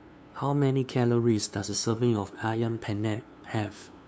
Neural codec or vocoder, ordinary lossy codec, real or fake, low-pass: codec, 16 kHz, 2 kbps, FunCodec, trained on LibriTTS, 25 frames a second; none; fake; none